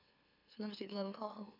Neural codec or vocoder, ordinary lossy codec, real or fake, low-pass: autoencoder, 44.1 kHz, a latent of 192 numbers a frame, MeloTTS; none; fake; 5.4 kHz